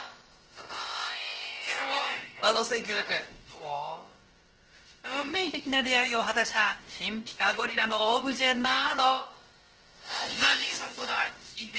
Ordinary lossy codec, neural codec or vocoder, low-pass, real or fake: Opus, 16 kbps; codec, 16 kHz, about 1 kbps, DyCAST, with the encoder's durations; 7.2 kHz; fake